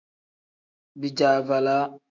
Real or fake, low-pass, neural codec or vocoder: fake; 7.2 kHz; autoencoder, 48 kHz, 128 numbers a frame, DAC-VAE, trained on Japanese speech